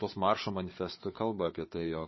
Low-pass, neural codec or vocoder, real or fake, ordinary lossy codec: 7.2 kHz; codec, 16 kHz, 4 kbps, FunCodec, trained on Chinese and English, 50 frames a second; fake; MP3, 24 kbps